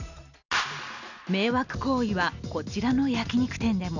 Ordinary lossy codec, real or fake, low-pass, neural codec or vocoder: none; real; 7.2 kHz; none